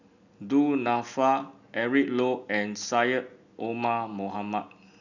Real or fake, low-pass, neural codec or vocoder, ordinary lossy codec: real; 7.2 kHz; none; none